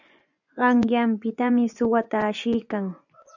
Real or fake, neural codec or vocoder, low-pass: fake; vocoder, 24 kHz, 100 mel bands, Vocos; 7.2 kHz